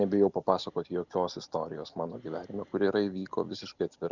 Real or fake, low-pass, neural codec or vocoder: real; 7.2 kHz; none